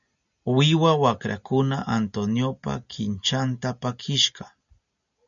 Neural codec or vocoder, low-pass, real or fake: none; 7.2 kHz; real